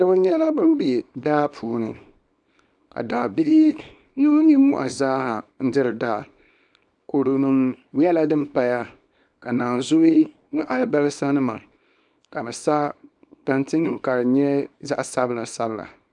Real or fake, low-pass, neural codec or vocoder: fake; 10.8 kHz; codec, 24 kHz, 0.9 kbps, WavTokenizer, small release